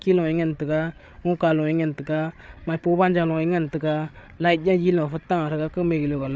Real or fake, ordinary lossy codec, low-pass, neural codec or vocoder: fake; none; none; codec, 16 kHz, 8 kbps, FreqCodec, larger model